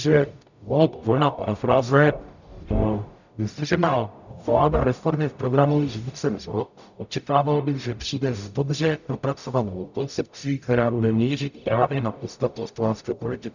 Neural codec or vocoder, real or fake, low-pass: codec, 44.1 kHz, 0.9 kbps, DAC; fake; 7.2 kHz